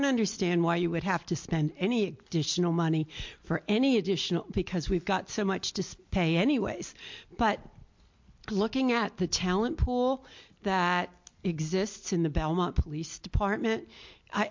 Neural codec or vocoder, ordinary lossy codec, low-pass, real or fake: none; MP3, 48 kbps; 7.2 kHz; real